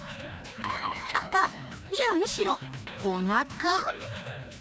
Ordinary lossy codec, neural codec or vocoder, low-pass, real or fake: none; codec, 16 kHz, 1 kbps, FreqCodec, larger model; none; fake